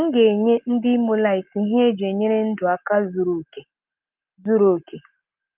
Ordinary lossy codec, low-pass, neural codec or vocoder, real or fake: Opus, 32 kbps; 3.6 kHz; none; real